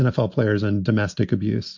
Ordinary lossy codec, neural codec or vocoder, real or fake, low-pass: MP3, 48 kbps; none; real; 7.2 kHz